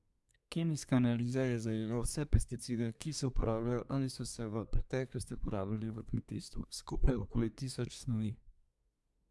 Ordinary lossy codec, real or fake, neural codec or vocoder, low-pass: none; fake; codec, 24 kHz, 1 kbps, SNAC; none